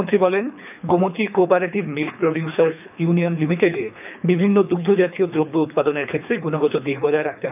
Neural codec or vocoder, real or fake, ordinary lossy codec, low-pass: codec, 24 kHz, 3 kbps, HILCodec; fake; none; 3.6 kHz